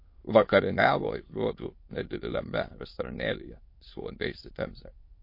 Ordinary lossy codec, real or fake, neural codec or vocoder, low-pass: MP3, 32 kbps; fake; autoencoder, 22.05 kHz, a latent of 192 numbers a frame, VITS, trained on many speakers; 5.4 kHz